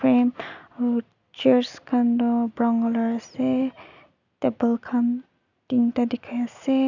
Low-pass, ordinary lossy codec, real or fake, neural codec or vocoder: 7.2 kHz; none; real; none